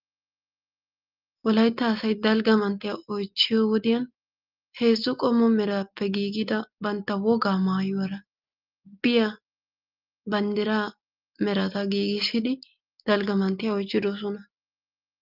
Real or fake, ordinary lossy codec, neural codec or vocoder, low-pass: real; Opus, 32 kbps; none; 5.4 kHz